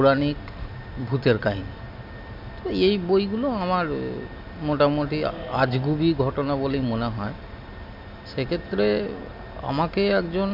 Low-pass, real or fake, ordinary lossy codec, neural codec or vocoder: 5.4 kHz; real; MP3, 48 kbps; none